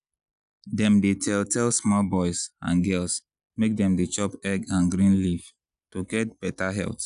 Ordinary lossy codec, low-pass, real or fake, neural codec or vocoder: none; 10.8 kHz; real; none